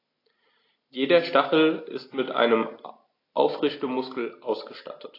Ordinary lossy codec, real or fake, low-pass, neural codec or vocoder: AAC, 32 kbps; real; 5.4 kHz; none